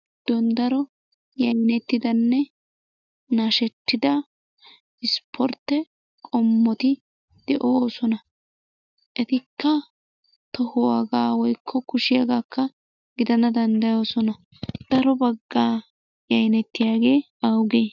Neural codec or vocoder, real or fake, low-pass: none; real; 7.2 kHz